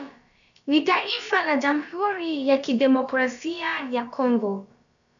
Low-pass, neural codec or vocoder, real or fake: 7.2 kHz; codec, 16 kHz, about 1 kbps, DyCAST, with the encoder's durations; fake